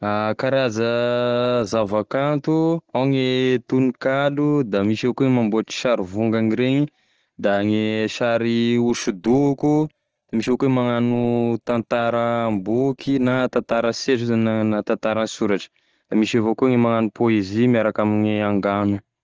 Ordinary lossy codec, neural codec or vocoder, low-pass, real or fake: Opus, 16 kbps; none; 7.2 kHz; real